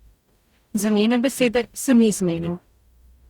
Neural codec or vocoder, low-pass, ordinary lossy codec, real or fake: codec, 44.1 kHz, 0.9 kbps, DAC; 19.8 kHz; Opus, 64 kbps; fake